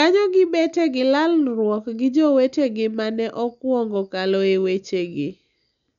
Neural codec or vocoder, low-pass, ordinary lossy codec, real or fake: none; 7.2 kHz; none; real